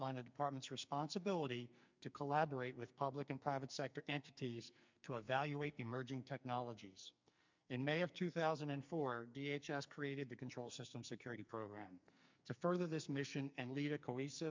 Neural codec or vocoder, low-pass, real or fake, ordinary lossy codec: codec, 44.1 kHz, 2.6 kbps, SNAC; 7.2 kHz; fake; MP3, 64 kbps